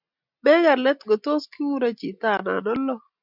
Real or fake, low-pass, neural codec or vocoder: real; 5.4 kHz; none